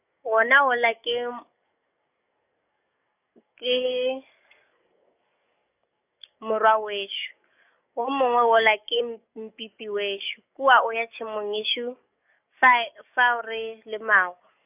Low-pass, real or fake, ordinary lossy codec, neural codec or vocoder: 3.6 kHz; fake; none; codec, 44.1 kHz, 7.8 kbps, DAC